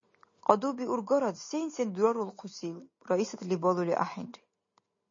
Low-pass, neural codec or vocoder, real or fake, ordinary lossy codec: 7.2 kHz; none; real; MP3, 32 kbps